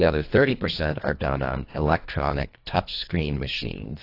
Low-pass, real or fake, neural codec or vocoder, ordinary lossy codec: 5.4 kHz; fake; codec, 24 kHz, 1.5 kbps, HILCodec; AAC, 32 kbps